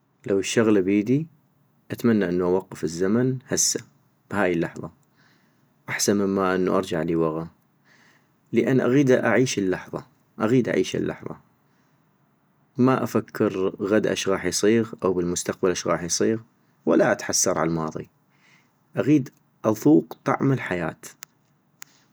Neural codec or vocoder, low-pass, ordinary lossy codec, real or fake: none; none; none; real